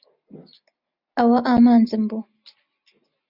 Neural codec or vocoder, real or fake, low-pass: none; real; 5.4 kHz